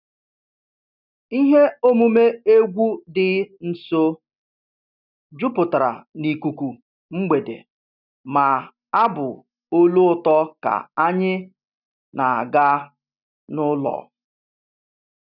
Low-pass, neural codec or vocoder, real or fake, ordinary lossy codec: 5.4 kHz; none; real; none